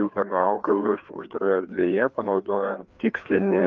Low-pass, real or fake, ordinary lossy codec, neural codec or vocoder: 7.2 kHz; fake; Opus, 24 kbps; codec, 16 kHz, 2 kbps, FreqCodec, larger model